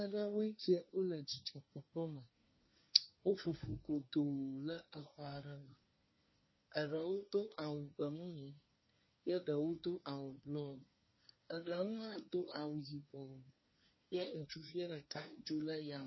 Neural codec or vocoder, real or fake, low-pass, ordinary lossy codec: codec, 24 kHz, 1 kbps, SNAC; fake; 7.2 kHz; MP3, 24 kbps